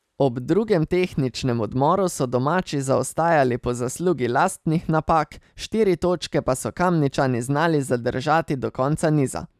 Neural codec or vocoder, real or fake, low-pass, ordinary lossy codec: none; real; 14.4 kHz; none